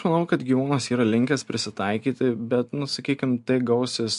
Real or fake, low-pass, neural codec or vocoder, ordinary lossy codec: real; 10.8 kHz; none; MP3, 64 kbps